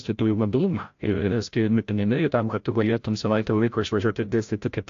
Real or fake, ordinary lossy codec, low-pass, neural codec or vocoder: fake; AAC, 48 kbps; 7.2 kHz; codec, 16 kHz, 0.5 kbps, FreqCodec, larger model